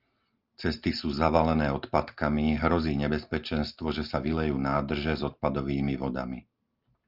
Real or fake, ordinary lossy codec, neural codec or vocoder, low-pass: real; Opus, 24 kbps; none; 5.4 kHz